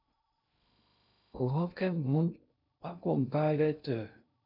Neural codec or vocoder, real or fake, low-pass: codec, 16 kHz in and 24 kHz out, 0.6 kbps, FocalCodec, streaming, 2048 codes; fake; 5.4 kHz